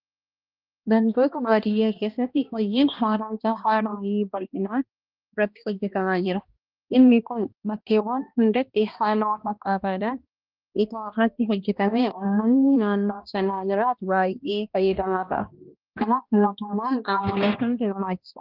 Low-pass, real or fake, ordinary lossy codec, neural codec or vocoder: 5.4 kHz; fake; Opus, 32 kbps; codec, 16 kHz, 1 kbps, X-Codec, HuBERT features, trained on balanced general audio